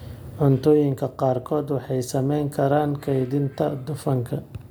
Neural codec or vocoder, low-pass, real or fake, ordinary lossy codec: none; none; real; none